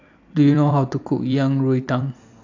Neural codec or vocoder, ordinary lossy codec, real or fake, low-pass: vocoder, 44.1 kHz, 128 mel bands every 256 samples, BigVGAN v2; AAC, 48 kbps; fake; 7.2 kHz